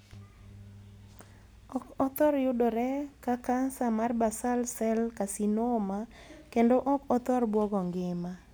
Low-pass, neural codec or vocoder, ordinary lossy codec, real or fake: none; none; none; real